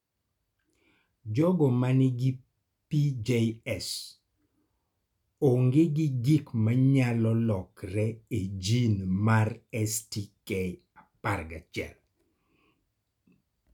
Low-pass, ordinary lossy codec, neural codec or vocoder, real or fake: 19.8 kHz; none; none; real